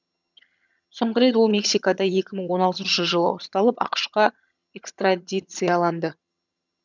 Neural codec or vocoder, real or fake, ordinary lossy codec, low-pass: vocoder, 22.05 kHz, 80 mel bands, HiFi-GAN; fake; none; 7.2 kHz